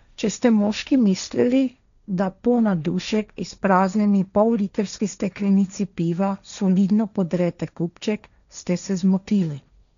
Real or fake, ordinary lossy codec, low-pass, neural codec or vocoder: fake; none; 7.2 kHz; codec, 16 kHz, 1.1 kbps, Voila-Tokenizer